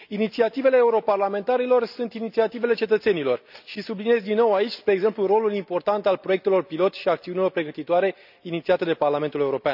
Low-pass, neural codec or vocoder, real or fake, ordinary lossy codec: 5.4 kHz; none; real; none